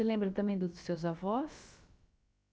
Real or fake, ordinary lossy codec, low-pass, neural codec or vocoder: fake; none; none; codec, 16 kHz, about 1 kbps, DyCAST, with the encoder's durations